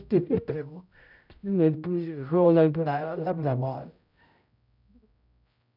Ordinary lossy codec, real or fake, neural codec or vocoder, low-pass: none; fake; codec, 16 kHz, 0.5 kbps, X-Codec, HuBERT features, trained on general audio; 5.4 kHz